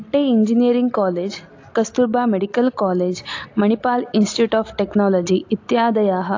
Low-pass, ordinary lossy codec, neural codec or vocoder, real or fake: 7.2 kHz; AAC, 48 kbps; none; real